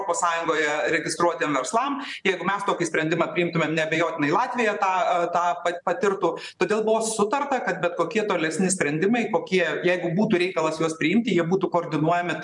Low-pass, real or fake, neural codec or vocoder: 10.8 kHz; fake; vocoder, 44.1 kHz, 128 mel bands every 512 samples, BigVGAN v2